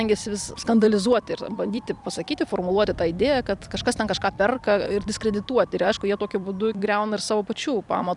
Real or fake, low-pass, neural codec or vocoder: fake; 10.8 kHz; vocoder, 44.1 kHz, 128 mel bands every 256 samples, BigVGAN v2